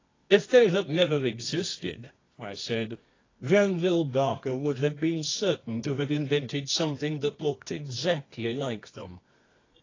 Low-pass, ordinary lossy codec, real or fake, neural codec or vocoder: 7.2 kHz; AAC, 32 kbps; fake; codec, 24 kHz, 0.9 kbps, WavTokenizer, medium music audio release